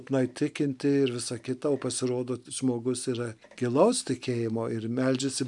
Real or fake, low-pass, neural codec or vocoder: real; 10.8 kHz; none